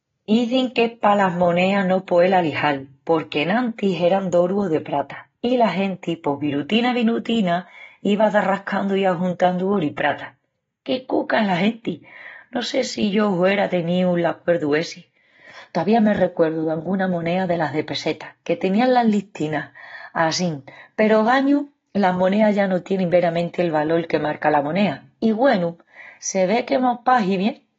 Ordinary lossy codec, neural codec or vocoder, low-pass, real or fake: AAC, 24 kbps; none; 7.2 kHz; real